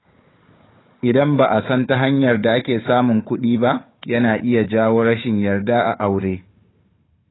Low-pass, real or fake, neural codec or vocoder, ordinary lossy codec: 7.2 kHz; fake; codec, 16 kHz, 4 kbps, FunCodec, trained on Chinese and English, 50 frames a second; AAC, 16 kbps